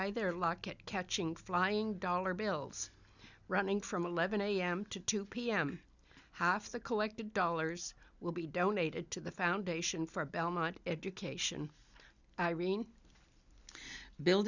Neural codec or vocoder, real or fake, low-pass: none; real; 7.2 kHz